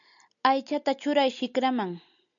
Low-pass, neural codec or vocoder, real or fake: 7.2 kHz; none; real